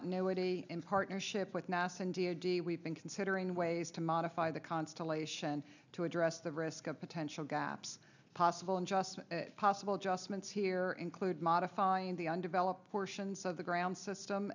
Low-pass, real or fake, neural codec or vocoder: 7.2 kHz; real; none